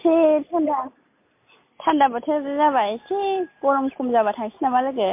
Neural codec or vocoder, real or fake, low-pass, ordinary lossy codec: none; real; 3.6 kHz; MP3, 24 kbps